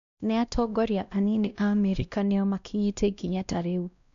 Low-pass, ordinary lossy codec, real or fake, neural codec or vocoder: 7.2 kHz; none; fake; codec, 16 kHz, 1 kbps, X-Codec, WavLM features, trained on Multilingual LibriSpeech